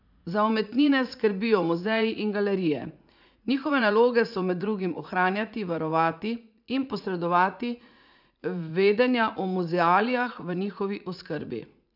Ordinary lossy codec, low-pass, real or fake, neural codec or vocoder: none; 5.4 kHz; fake; vocoder, 44.1 kHz, 80 mel bands, Vocos